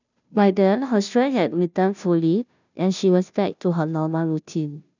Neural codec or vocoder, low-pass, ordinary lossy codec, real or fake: codec, 16 kHz, 0.5 kbps, FunCodec, trained on Chinese and English, 25 frames a second; 7.2 kHz; none; fake